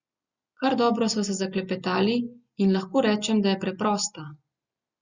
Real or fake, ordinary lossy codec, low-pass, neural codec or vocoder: real; Opus, 64 kbps; 7.2 kHz; none